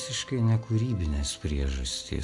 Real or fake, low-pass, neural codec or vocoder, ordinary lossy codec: real; 10.8 kHz; none; AAC, 64 kbps